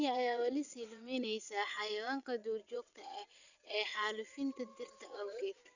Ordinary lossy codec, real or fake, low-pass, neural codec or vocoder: none; fake; 7.2 kHz; vocoder, 22.05 kHz, 80 mel bands, WaveNeXt